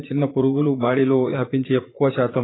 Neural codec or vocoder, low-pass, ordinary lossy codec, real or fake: vocoder, 44.1 kHz, 80 mel bands, Vocos; 7.2 kHz; AAC, 16 kbps; fake